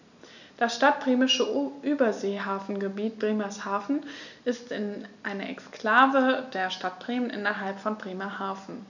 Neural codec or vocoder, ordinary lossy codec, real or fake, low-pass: none; none; real; 7.2 kHz